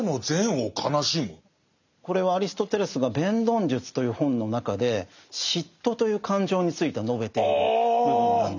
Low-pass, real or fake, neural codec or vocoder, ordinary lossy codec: 7.2 kHz; real; none; none